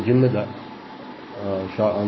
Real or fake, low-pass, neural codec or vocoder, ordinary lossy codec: fake; 7.2 kHz; codec, 44.1 kHz, 7.8 kbps, Pupu-Codec; MP3, 24 kbps